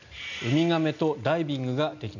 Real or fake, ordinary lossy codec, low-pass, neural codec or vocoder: real; none; 7.2 kHz; none